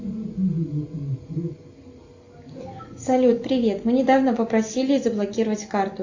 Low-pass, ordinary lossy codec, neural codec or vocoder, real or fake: 7.2 kHz; AAC, 48 kbps; none; real